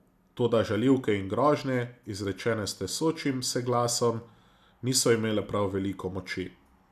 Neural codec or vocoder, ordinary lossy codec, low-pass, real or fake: none; none; 14.4 kHz; real